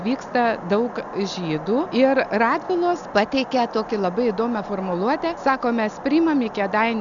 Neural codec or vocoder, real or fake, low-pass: none; real; 7.2 kHz